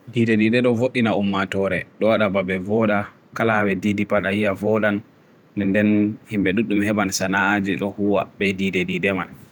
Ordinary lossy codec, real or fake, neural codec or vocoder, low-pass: none; fake; vocoder, 44.1 kHz, 128 mel bands every 512 samples, BigVGAN v2; 19.8 kHz